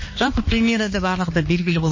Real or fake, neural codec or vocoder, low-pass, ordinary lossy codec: fake; codec, 16 kHz, 2 kbps, X-Codec, HuBERT features, trained on balanced general audio; 7.2 kHz; MP3, 32 kbps